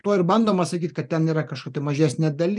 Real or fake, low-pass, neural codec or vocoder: real; 10.8 kHz; none